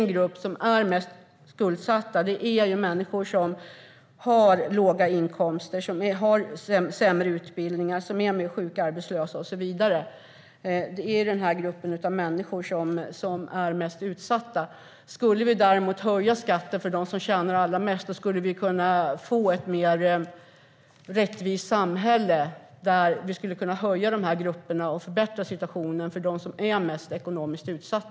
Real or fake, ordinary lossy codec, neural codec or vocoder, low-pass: real; none; none; none